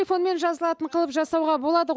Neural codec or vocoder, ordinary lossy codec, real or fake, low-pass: none; none; real; none